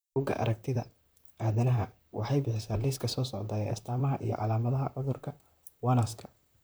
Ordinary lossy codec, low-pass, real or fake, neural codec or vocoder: none; none; fake; vocoder, 44.1 kHz, 128 mel bands, Pupu-Vocoder